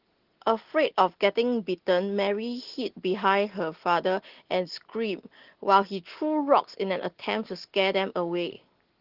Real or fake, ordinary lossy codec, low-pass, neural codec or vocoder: real; Opus, 16 kbps; 5.4 kHz; none